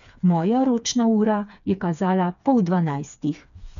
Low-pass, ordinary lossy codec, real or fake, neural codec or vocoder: 7.2 kHz; MP3, 96 kbps; fake; codec, 16 kHz, 4 kbps, FreqCodec, smaller model